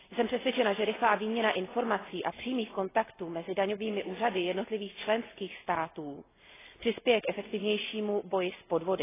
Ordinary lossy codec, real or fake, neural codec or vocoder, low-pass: AAC, 16 kbps; real; none; 3.6 kHz